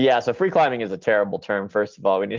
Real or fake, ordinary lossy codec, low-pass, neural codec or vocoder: real; Opus, 24 kbps; 7.2 kHz; none